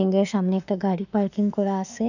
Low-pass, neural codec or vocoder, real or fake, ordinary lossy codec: 7.2 kHz; autoencoder, 48 kHz, 32 numbers a frame, DAC-VAE, trained on Japanese speech; fake; none